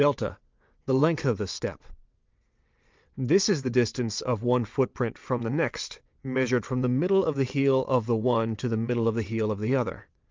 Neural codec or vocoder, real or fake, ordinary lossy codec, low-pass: vocoder, 22.05 kHz, 80 mel bands, WaveNeXt; fake; Opus, 24 kbps; 7.2 kHz